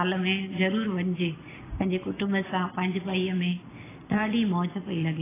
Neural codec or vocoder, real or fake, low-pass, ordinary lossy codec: none; real; 3.6 kHz; AAC, 16 kbps